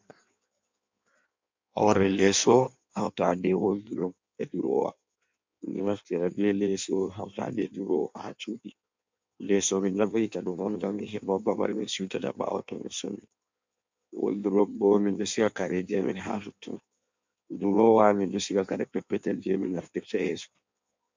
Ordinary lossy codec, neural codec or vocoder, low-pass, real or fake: MP3, 64 kbps; codec, 16 kHz in and 24 kHz out, 1.1 kbps, FireRedTTS-2 codec; 7.2 kHz; fake